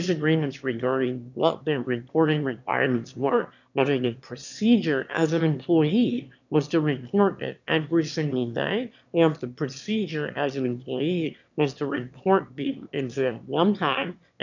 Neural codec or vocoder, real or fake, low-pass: autoencoder, 22.05 kHz, a latent of 192 numbers a frame, VITS, trained on one speaker; fake; 7.2 kHz